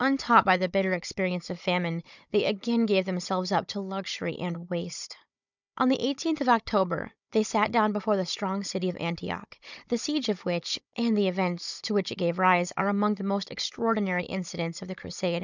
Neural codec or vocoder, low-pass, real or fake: codec, 16 kHz, 16 kbps, FunCodec, trained on Chinese and English, 50 frames a second; 7.2 kHz; fake